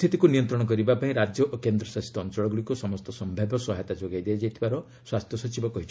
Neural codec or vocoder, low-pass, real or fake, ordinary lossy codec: none; none; real; none